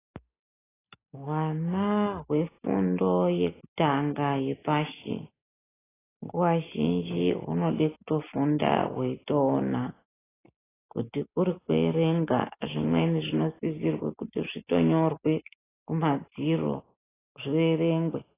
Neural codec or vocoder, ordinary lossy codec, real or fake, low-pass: none; AAC, 16 kbps; real; 3.6 kHz